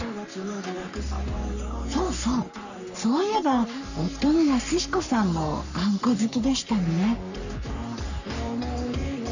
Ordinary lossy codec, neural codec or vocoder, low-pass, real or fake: none; codec, 44.1 kHz, 3.4 kbps, Pupu-Codec; 7.2 kHz; fake